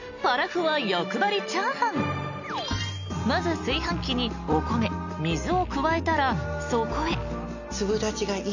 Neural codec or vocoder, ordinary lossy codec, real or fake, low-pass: none; none; real; 7.2 kHz